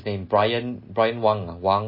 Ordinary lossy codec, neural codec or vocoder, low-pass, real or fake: MP3, 24 kbps; none; 5.4 kHz; real